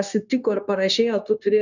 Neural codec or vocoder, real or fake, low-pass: codec, 16 kHz, 0.9 kbps, LongCat-Audio-Codec; fake; 7.2 kHz